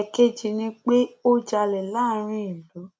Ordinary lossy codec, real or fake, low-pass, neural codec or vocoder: none; real; none; none